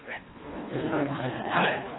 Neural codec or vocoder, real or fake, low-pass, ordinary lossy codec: codec, 24 kHz, 1.5 kbps, HILCodec; fake; 7.2 kHz; AAC, 16 kbps